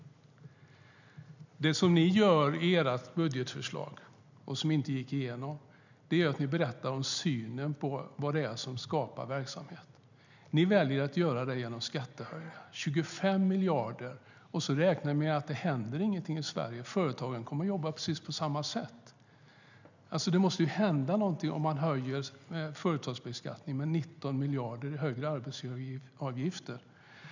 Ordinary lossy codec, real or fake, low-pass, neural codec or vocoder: none; real; 7.2 kHz; none